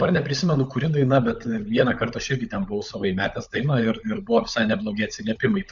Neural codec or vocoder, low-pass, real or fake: codec, 16 kHz, 16 kbps, FunCodec, trained on LibriTTS, 50 frames a second; 7.2 kHz; fake